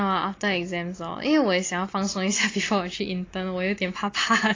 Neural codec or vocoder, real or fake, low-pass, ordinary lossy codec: none; real; 7.2 kHz; AAC, 32 kbps